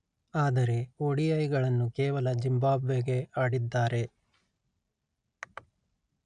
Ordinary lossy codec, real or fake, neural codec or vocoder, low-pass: none; real; none; 9.9 kHz